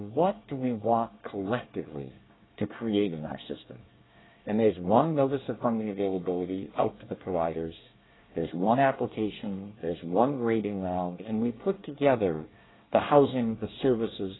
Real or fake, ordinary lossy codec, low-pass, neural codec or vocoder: fake; AAC, 16 kbps; 7.2 kHz; codec, 24 kHz, 1 kbps, SNAC